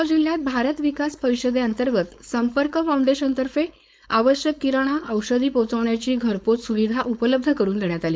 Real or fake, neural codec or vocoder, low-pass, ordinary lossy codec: fake; codec, 16 kHz, 4.8 kbps, FACodec; none; none